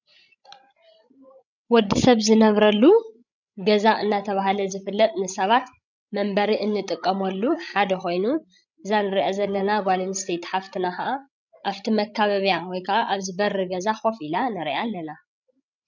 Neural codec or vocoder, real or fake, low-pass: codec, 16 kHz, 16 kbps, FreqCodec, larger model; fake; 7.2 kHz